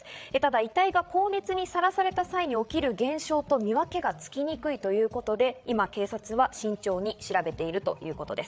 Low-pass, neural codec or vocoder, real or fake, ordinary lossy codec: none; codec, 16 kHz, 8 kbps, FreqCodec, larger model; fake; none